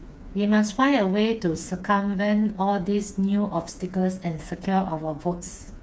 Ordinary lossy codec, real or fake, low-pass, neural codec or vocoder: none; fake; none; codec, 16 kHz, 4 kbps, FreqCodec, smaller model